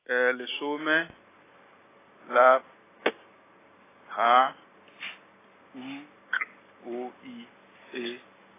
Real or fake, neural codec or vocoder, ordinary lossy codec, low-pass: real; none; AAC, 16 kbps; 3.6 kHz